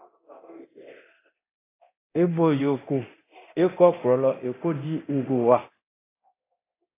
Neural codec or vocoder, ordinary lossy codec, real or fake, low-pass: codec, 24 kHz, 0.9 kbps, DualCodec; AAC, 24 kbps; fake; 3.6 kHz